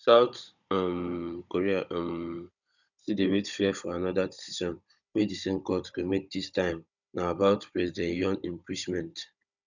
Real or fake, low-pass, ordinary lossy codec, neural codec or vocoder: fake; 7.2 kHz; none; codec, 16 kHz, 16 kbps, FunCodec, trained on Chinese and English, 50 frames a second